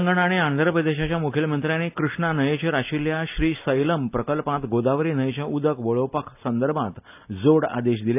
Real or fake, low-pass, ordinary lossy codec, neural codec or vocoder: real; 3.6 kHz; AAC, 32 kbps; none